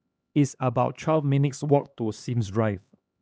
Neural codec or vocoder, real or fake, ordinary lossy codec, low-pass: codec, 16 kHz, 2 kbps, X-Codec, HuBERT features, trained on LibriSpeech; fake; none; none